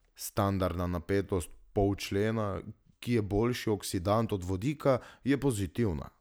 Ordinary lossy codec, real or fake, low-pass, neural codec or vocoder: none; real; none; none